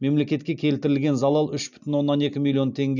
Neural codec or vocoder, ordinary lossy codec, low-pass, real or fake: none; none; 7.2 kHz; real